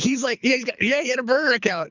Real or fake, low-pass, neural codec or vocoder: fake; 7.2 kHz; codec, 24 kHz, 3 kbps, HILCodec